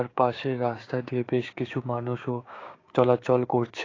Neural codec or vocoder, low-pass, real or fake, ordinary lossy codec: codec, 16 kHz, 6 kbps, DAC; 7.2 kHz; fake; AAC, 32 kbps